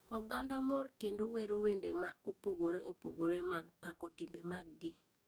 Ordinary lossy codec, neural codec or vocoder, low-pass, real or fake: none; codec, 44.1 kHz, 2.6 kbps, DAC; none; fake